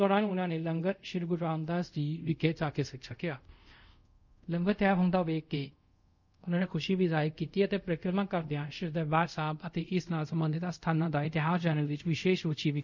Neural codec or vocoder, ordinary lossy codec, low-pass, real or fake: codec, 24 kHz, 0.5 kbps, DualCodec; none; 7.2 kHz; fake